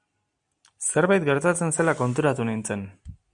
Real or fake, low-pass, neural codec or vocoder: real; 9.9 kHz; none